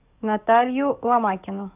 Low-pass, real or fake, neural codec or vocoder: 3.6 kHz; fake; codec, 44.1 kHz, 7.8 kbps, DAC